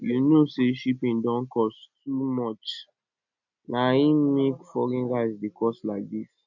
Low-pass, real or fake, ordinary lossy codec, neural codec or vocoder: 7.2 kHz; real; none; none